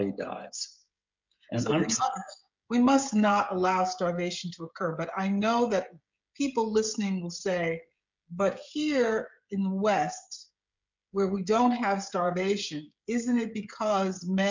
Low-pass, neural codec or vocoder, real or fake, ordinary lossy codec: 7.2 kHz; codec, 16 kHz, 16 kbps, FreqCodec, smaller model; fake; MP3, 64 kbps